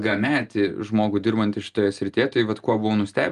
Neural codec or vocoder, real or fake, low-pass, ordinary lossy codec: none; real; 10.8 kHz; Opus, 32 kbps